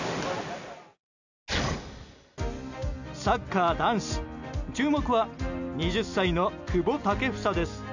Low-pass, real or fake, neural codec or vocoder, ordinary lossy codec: 7.2 kHz; real; none; none